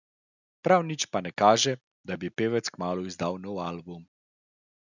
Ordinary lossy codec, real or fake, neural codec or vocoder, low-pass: none; real; none; 7.2 kHz